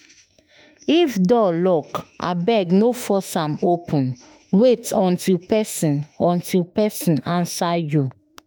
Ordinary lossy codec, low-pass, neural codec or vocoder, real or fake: none; none; autoencoder, 48 kHz, 32 numbers a frame, DAC-VAE, trained on Japanese speech; fake